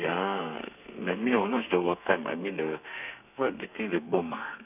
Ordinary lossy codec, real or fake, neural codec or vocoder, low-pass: none; fake; codec, 32 kHz, 1.9 kbps, SNAC; 3.6 kHz